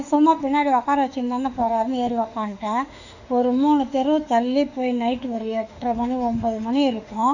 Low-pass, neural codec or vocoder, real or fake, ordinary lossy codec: 7.2 kHz; autoencoder, 48 kHz, 32 numbers a frame, DAC-VAE, trained on Japanese speech; fake; none